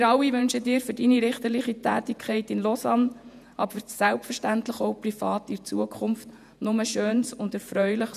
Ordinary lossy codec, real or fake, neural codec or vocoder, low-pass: none; fake; vocoder, 48 kHz, 128 mel bands, Vocos; 14.4 kHz